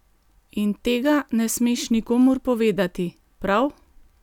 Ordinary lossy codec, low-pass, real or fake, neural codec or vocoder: none; 19.8 kHz; real; none